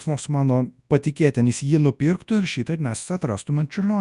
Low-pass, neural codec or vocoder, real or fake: 10.8 kHz; codec, 24 kHz, 0.9 kbps, WavTokenizer, large speech release; fake